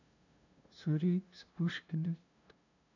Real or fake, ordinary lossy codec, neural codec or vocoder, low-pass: fake; none; codec, 16 kHz, 0.5 kbps, FunCodec, trained on LibriTTS, 25 frames a second; 7.2 kHz